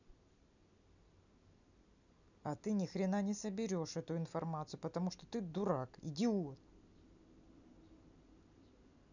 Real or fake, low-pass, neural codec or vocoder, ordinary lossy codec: real; 7.2 kHz; none; none